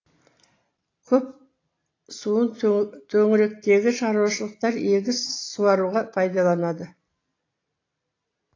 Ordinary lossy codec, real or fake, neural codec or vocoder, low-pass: AAC, 32 kbps; real; none; 7.2 kHz